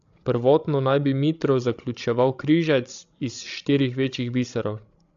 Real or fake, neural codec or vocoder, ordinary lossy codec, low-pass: fake; codec, 16 kHz, 16 kbps, FreqCodec, larger model; AAC, 64 kbps; 7.2 kHz